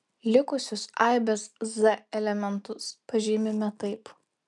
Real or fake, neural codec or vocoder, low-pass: real; none; 10.8 kHz